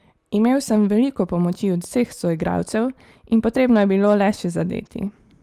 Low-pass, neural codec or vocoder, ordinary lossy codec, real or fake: 14.4 kHz; none; Opus, 32 kbps; real